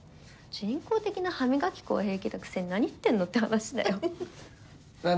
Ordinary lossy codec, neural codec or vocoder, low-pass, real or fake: none; none; none; real